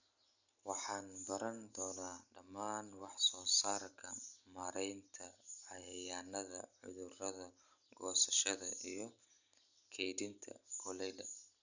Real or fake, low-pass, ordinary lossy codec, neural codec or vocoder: real; 7.2 kHz; none; none